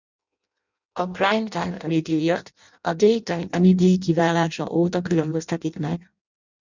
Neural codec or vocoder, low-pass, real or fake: codec, 16 kHz in and 24 kHz out, 0.6 kbps, FireRedTTS-2 codec; 7.2 kHz; fake